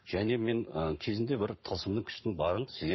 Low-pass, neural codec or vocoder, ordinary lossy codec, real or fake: 7.2 kHz; codec, 44.1 kHz, 7.8 kbps, Pupu-Codec; MP3, 24 kbps; fake